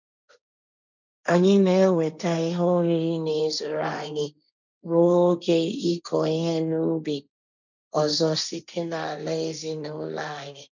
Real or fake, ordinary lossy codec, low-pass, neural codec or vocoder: fake; none; 7.2 kHz; codec, 16 kHz, 1.1 kbps, Voila-Tokenizer